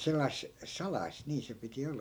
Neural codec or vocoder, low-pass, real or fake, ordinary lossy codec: none; none; real; none